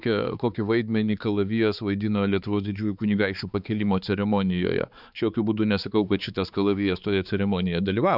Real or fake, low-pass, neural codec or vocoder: fake; 5.4 kHz; codec, 16 kHz, 4 kbps, X-Codec, HuBERT features, trained on balanced general audio